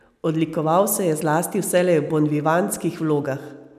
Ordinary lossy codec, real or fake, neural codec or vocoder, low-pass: none; real; none; 14.4 kHz